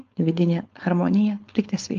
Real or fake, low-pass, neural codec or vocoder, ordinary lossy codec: fake; 7.2 kHz; codec, 16 kHz, 4.8 kbps, FACodec; Opus, 24 kbps